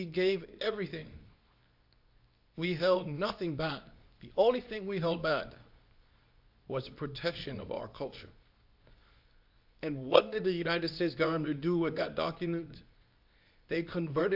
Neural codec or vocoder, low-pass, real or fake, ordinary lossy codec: codec, 24 kHz, 0.9 kbps, WavTokenizer, medium speech release version 2; 5.4 kHz; fake; AAC, 48 kbps